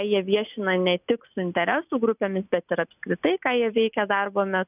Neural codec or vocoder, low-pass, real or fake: none; 3.6 kHz; real